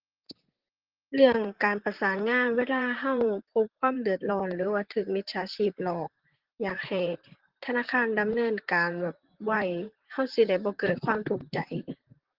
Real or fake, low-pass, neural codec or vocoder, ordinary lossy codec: fake; 5.4 kHz; vocoder, 44.1 kHz, 128 mel bands, Pupu-Vocoder; Opus, 16 kbps